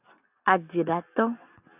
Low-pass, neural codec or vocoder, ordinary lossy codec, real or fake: 3.6 kHz; none; AAC, 32 kbps; real